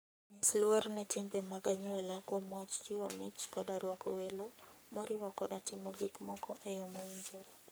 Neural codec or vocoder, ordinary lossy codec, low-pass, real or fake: codec, 44.1 kHz, 3.4 kbps, Pupu-Codec; none; none; fake